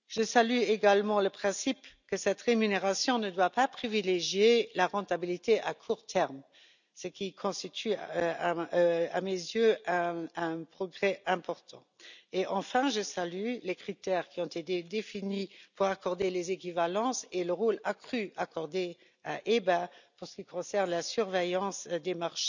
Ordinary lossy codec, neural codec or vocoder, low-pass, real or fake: none; none; 7.2 kHz; real